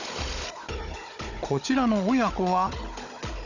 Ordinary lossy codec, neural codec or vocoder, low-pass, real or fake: none; codec, 16 kHz, 16 kbps, FunCodec, trained on Chinese and English, 50 frames a second; 7.2 kHz; fake